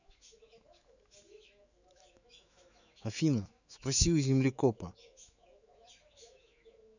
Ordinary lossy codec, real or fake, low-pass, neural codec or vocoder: none; fake; 7.2 kHz; autoencoder, 48 kHz, 128 numbers a frame, DAC-VAE, trained on Japanese speech